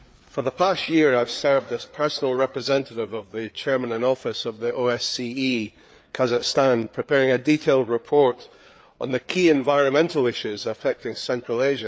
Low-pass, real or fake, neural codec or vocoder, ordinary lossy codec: none; fake; codec, 16 kHz, 4 kbps, FreqCodec, larger model; none